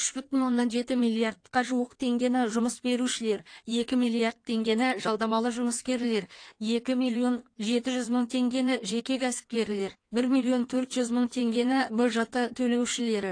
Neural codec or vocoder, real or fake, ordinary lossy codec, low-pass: codec, 16 kHz in and 24 kHz out, 1.1 kbps, FireRedTTS-2 codec; fake; AAC, 48 kbps; 9.9 kHz